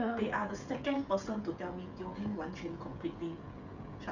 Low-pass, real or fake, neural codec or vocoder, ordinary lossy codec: 7.2 kHz; fake; codec, 16 kHz, 16 kbps, FreqCodec, smaller model; none